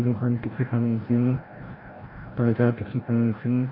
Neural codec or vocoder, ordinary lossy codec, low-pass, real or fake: codec, 16 kHz, 0.5 kbps, FreqCodec, larger model; AAC, 24 kbps; 5.4 kHz; fake